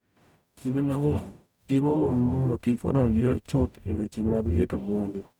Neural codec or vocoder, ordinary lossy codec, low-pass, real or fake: codec, 44.1 kHz, 0.9 kbps, DAC; none; 19.8 kHz; fake